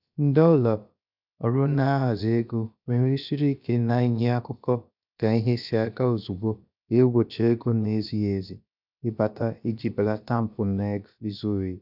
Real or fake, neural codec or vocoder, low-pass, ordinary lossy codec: fake; codec, 16 kHz, about 1 kbps, DyCAST, with the encoder's durations; 5.4 kHz; none